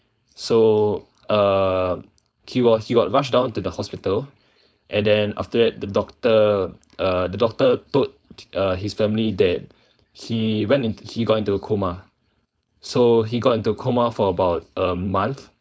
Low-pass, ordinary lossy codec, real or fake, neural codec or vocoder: none; none; fake; codec, 16 kHz, 4.8 kbps, FACodec